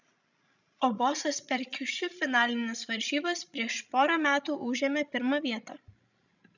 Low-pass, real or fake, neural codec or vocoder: 7.2 kHz; fake; codec, 16 kHz, 16 kbps, FreqCodec, larger model